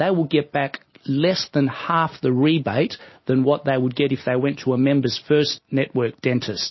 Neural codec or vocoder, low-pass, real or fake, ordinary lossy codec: none; 7.2 kHz; real; MP3, 24 kbps